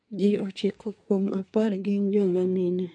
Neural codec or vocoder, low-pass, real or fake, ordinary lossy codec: codec, 24 kHz, 1 kbps, SNAC; 9.9 kHz; fake; MP3, 64 kbps